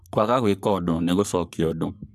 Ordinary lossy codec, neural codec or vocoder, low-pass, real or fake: none; codec, 44.1 kHz, 3.4 kbps, Pupu-Codec; 14.4 kHz; fake